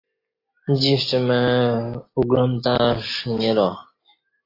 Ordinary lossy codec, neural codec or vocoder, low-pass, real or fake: AAC, 24 kbps; none; 5.4 kHz; real